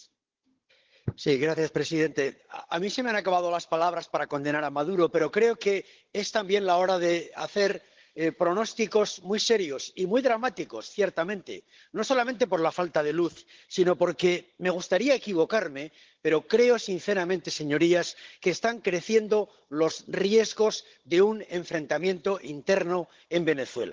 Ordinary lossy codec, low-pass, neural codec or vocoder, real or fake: Opus, 16 kbps; 7.2 kHz; codec, 16 kHz, 16 kbps, FunCodec, trained on Chinese and English, 50 frames a second; fake